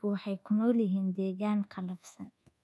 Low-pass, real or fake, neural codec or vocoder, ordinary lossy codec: none; fake; codec, 24 kHz, 1.2 kbps, DualCodec; none